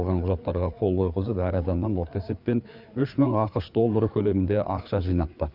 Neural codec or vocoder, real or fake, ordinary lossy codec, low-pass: codec, 16 kHz, 4 kbps, FreqCodec, larger model; fake; none; 5.4 kHz